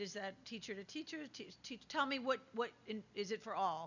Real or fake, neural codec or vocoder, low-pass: real; none; 7.2 kHz